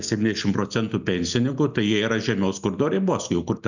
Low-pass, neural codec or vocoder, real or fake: 7.2 kHz; none; real